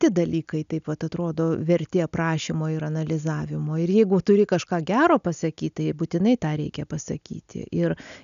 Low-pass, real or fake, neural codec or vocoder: 7.2 kHz; real; none